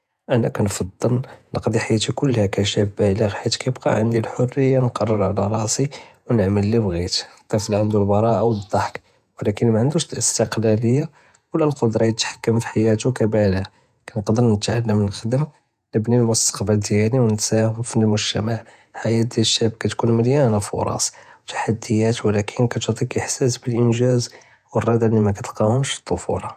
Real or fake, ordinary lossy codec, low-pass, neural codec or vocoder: fake; none; 14.4 kHz; vocoder, 48 kHz, 128 mel bands, Vocos